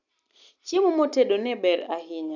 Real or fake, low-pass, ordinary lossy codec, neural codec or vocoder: real; 7.2 kHz; none; none